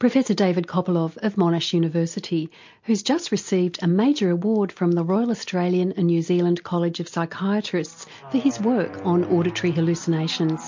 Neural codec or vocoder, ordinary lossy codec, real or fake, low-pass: none; MP3, 48 kbps; real; 7.2 kHz